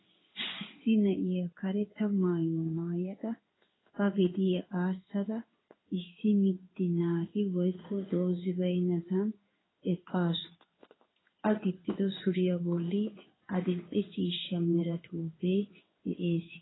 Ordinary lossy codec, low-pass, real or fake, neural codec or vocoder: AAC, 16 kbps; 7.2 kHz; fake; codec, 16 kHz in and 24 kHz out, 1 kbps, XY-Tokenizer